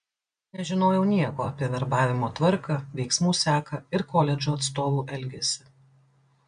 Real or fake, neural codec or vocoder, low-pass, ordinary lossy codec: real; none; 9.9 kHz; MP3, 64 kbps